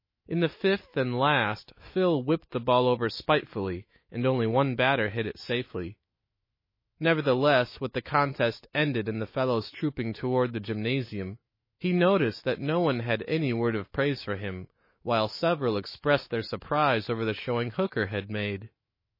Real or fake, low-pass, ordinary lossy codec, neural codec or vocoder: fake; 5.4 kHz; MP3, 24 kbps; autoencoder, 48 kHz, 128 numbers a frame, DAC-VAE, trained on Japanese speech